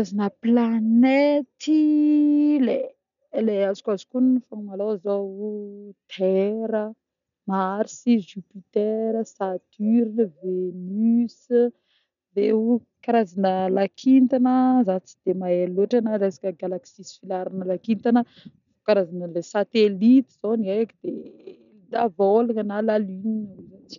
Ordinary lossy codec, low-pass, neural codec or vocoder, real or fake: none; 7.2 kHz; none; real